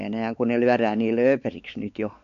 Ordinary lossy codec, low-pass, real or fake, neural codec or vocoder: none; 7.2 kHz; fake; codec, 16 kHz, 4 kbps, X-Codec, WavLM features, trained on Multilingual LibriSpeech